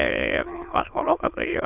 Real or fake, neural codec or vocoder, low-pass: fake; autoencoder, 22.05 kHz, a latent of 192 numbers a frame, VITS, trained on many speakers; 3.6 kHz